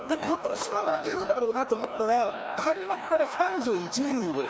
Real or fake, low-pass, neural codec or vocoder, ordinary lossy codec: fake; none; codec, 16 kHz, 1 kbps, FreqCodec, larger model; none